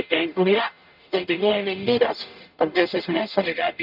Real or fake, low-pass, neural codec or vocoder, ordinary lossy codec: fake; 5.4 kHz; codec, 44.1 kHz, 0.9 kbps, DAC; none